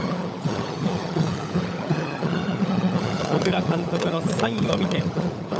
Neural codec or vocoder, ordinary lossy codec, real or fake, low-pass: codec, 16 kHz, 16 kbps, FunCodec, trained on LibriTTS, 50 frames a second; none; fake; none